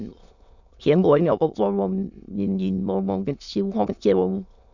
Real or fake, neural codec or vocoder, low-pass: fake; autoencoder, 22.05 kHz, a latent of 192 numbers a frame, VITS, trained on many speakers; 7.2 kHz